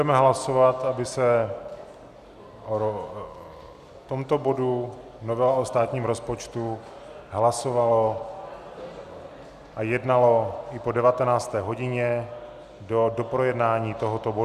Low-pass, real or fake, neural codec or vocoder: 14.4 kHz; real; none